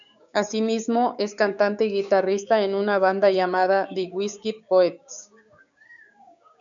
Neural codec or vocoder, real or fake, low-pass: codec, 16 kHz, 6 kbps, DAC; fake; 7.2 kHz